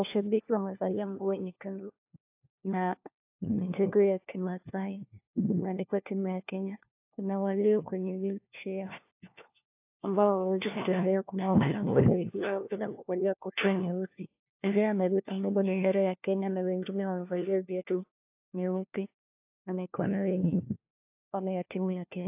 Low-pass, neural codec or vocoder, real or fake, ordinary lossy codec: 3.6 kHz; codec, 16 kHz, 1 kbps, FunCodec, trained on LibriTTS, 50 frames a second; fake; AAC, 32 kbps